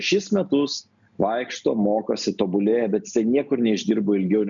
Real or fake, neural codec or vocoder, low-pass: real; none; 7.2 kHz